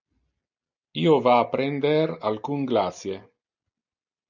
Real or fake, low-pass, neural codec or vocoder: real; 7.2 kHz; none